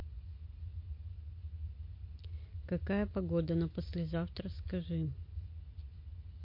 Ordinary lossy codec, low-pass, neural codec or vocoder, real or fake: MP3, 32 kbps; 5.4 kHz; none; real